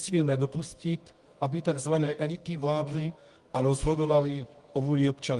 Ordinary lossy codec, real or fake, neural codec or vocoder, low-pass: Opus, 24 kbps; fake; codec, 24 kHz, 0.9 kbps, WavTokenizer, medium music audio release; 10.8 kHz